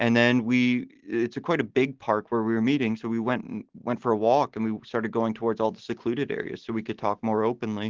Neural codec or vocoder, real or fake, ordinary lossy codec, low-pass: none; real; Opus, 16 kbps; 7.2 kHz